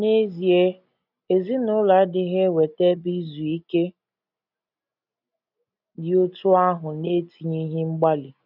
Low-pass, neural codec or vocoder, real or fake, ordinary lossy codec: 5.4 kHz; none; real; none